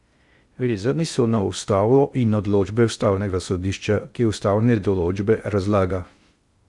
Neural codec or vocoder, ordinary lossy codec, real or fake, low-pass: codec, 16 kHz in and 24 kHz out, 0.6 kbps, FocalCodec, streaming, 2048 codes; Opus, 64 kbps; fake; 10.8 kHz